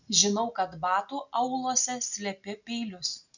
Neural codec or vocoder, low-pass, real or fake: none; 7.2 kHz; real